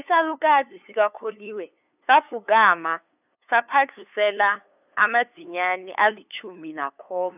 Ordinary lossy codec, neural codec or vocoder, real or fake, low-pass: none; codec, 16 kHz, 2 kbps, FunCodec, trained on LibriTTS, 25 frames a second; fake; 3.6 kHz